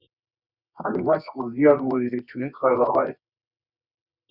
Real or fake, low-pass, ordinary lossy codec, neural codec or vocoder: fake; 5.4 kHz; Opus, 64 kbps; codec, 24 kHz, 0.9 kbps, WavTokenizer, medium music audio release